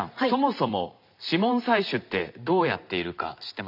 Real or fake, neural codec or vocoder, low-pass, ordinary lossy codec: fake; vocoder, 44.1 kHz, 128 mel bands every 512 samples, BigVGAN v2; 5.4 kHz; none